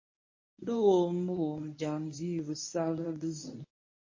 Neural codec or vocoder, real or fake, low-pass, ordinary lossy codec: codec, 24 kHz, 0.9 kbps, WavTokenizer, medium speech release version 1; fake; 7.2 kHz; MP3, 32 kbps